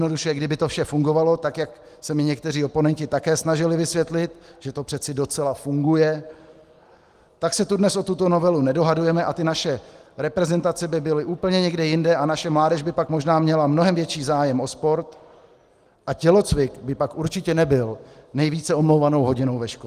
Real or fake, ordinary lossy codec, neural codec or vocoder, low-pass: real; Opus, 24 kbps; none; 14.4 kHz